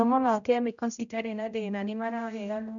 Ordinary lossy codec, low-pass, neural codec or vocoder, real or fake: none; 7.2 kHz; codec, 16 kHz, 0.5 kbps, X-Codec, HuBERT features, trained on general audio; fake